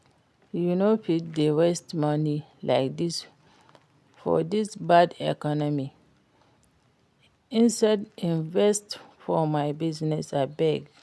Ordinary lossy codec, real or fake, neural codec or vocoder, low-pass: none; real; none; none